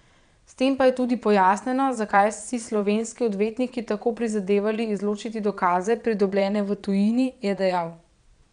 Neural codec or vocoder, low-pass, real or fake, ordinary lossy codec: vocoder, 22.05 kHz, 80 mel bands, Vocos; 9.9 kHz; fake; none